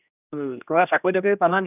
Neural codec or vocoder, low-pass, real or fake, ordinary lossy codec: codec, 16 kHz, 1 kbps, X-Codec, HuBERT features, trained on general audio; 3.6 kHz; fake; none